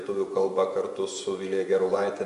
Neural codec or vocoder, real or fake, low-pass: vocoder, 24 kHz, 100 mel bands, Vocos; fake; 10.8 kHz